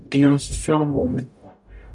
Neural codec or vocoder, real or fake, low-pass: codec, 44.1 kHz, 0.9 kbps, DAC; fake; 10.8 kHz